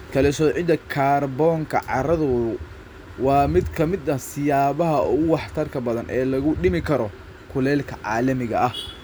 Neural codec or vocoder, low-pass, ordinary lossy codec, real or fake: none; none; none; real